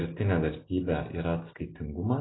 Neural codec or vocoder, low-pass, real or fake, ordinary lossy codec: none; 7.2 kHz; real; AAC, 16 kbps